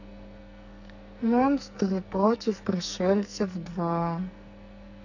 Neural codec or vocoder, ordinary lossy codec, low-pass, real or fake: codec, 44.1 kHz, 2.6 kbps, SNAC; none; 7.2 kHz; fake